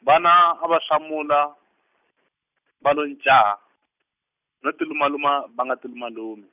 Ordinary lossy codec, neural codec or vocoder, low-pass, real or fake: none; none; 3.6 kHz; real